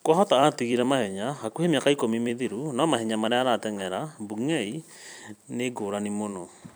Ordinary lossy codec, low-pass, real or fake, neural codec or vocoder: none; none; fake; vocoder, 44.1 kHz, 128 mel bands every 256 samples, BigVGAN v2